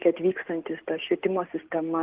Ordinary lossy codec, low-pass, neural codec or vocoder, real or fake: Opus, 16 kbps; 3.6 kHz; none; real